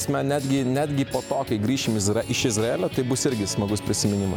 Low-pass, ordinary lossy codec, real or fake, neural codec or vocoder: 19.8 kHz; MP3, 96 kbps; real; none